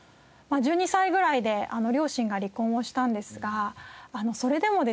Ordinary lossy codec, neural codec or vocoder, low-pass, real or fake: none; none; none; real